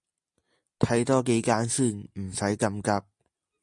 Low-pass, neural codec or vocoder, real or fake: 10.8 kHz; none; real